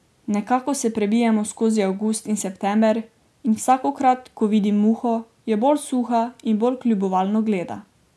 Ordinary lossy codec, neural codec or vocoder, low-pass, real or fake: none; none; none; real